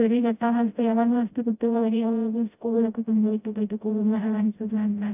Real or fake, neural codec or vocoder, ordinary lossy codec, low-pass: fake; codec, 16 kHz, 0.5 kbps, FreqCodec, smaller model; AAC, 32 kbps; 3.6 kHz